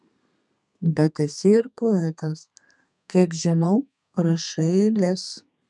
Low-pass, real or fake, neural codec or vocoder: 10.8 kHz; fake; codec, 32 kHz, 1.9 kbps, SNAC